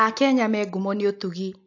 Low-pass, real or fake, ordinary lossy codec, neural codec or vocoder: 7.2 kHz; real; AAC, 48 kbps; none